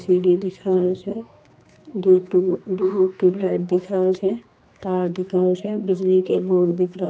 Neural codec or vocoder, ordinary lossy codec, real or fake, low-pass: codec, 16 kHz, 2 kbps, X-Codec, HuBERT features, trained on general audio; none; fake; none